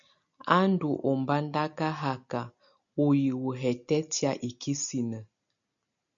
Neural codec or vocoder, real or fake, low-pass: none; real; 7.2 kHz